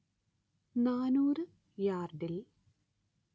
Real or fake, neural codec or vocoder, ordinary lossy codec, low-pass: real; none; none; none